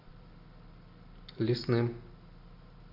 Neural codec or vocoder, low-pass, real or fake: none; 5.4 kHz; real